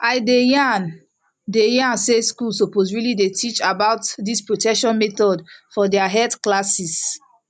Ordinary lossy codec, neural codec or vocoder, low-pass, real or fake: none; none; 10.8 kHz; real